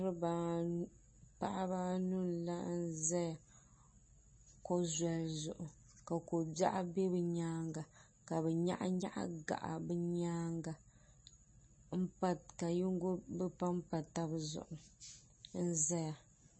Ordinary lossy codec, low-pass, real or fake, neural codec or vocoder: MP3, 32 kbps; 9.9 kHz; real; none